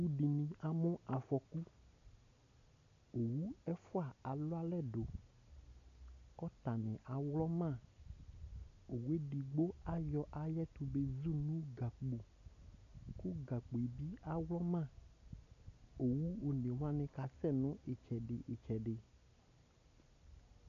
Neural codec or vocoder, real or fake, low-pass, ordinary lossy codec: none; real; 7.2 kHz; AAC, 96 kbps